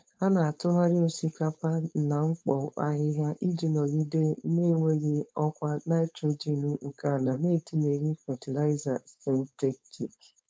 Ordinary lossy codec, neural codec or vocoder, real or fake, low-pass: none; codec, 16 kHz, 4.8 kbps, FACodec; fake; none